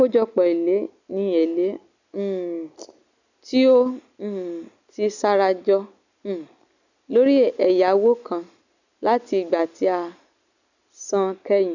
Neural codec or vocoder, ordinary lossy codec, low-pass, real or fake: none; none; 7.2 kHz; real